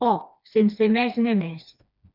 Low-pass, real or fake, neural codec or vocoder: 5.4 kHz; fake; codec, 16 kHz, 2 kbps, FreqCodec, smaller model